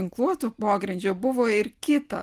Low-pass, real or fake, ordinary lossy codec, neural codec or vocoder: 14.4 kHz; real; Opus, 24 kbps; none